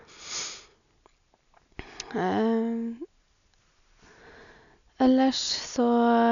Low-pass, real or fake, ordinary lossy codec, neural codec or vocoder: 7.2 kHz; real; none; none